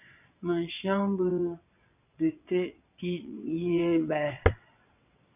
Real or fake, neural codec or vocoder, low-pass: fake; vocoder, 22.05 kHz, 80 mel bands, WaveNeXt; 3.6 kHz